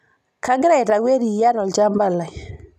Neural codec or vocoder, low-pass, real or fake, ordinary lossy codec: none; 14.4 kHz; real; none